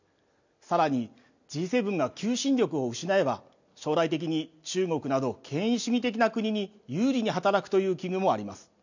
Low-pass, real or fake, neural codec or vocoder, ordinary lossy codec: 7.2 kHz; real; none; none